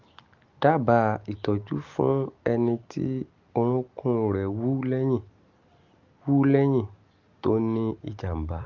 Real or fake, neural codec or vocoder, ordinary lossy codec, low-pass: real; none; Opus, 32 kbps; 7.2 kHz